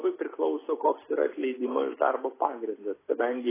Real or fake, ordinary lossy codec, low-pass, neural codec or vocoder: real; AAC, 16 kbps; 3.6 kHz; none